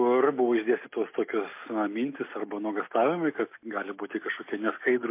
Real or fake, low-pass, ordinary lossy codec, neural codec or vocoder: real; 3.6 kHz; MP3, 24 kbps; none